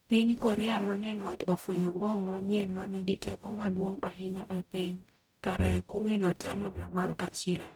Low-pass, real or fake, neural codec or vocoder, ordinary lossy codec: none; fake; codec, 44.1 kHz, 0.9 kbps, DAC; none